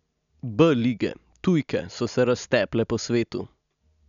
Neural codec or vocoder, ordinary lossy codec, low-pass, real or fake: none; none; 7.2 kHz; real